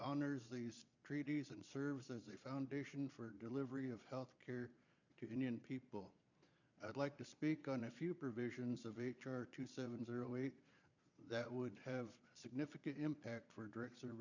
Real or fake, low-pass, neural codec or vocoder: fake; 7.2 kHz; vocoder, 22.05 kHz, 80 mel bands, Vocos